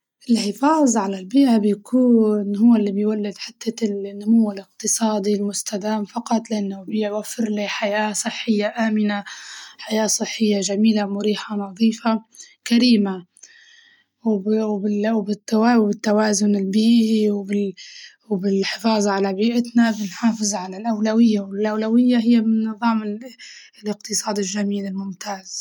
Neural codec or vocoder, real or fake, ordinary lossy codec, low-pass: none; real; none; 19.8 kHz